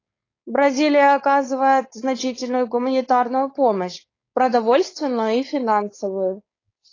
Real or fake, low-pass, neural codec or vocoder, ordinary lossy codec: fake; 7.2 kHz; codec, 16 kHz, 4.8 kbps, FACodec; AAC, 32 kbps